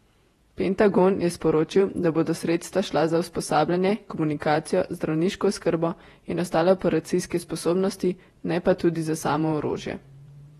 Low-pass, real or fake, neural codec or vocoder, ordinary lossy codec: 19.8 kHz; fake; vocoder, 48 kHz, 128 mel bands, Vocos; AAC, 32 kbps